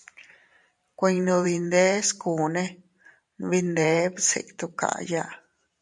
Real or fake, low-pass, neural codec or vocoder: fake; 10.8 kHz; vocoder, 44.1 kHz, 128 mel bands every 512 samples, BigVGAN v2